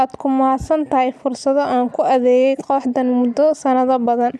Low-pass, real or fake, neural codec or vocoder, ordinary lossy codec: none; real; none; none